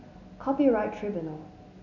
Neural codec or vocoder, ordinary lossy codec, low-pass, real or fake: none; none; 7.2 kHz; real